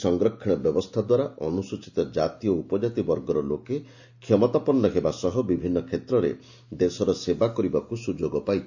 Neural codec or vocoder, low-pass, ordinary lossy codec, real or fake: none; 7.2 kHz; none; real